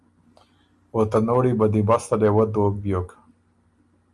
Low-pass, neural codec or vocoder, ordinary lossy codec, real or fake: 10.8 kHz; none; Opus, 32 kbps; real